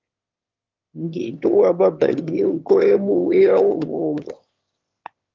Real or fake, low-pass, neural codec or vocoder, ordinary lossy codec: fake; 7.2 kHz; autoencoder, 22.05 kHz, a latent of 192 numbers a frame, VITS, trained on one speaker; Opus, 32 kbps